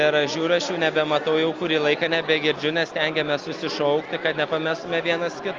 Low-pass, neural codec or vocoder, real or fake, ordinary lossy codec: 7.2 kHz; none; real; Opus, 32 kbps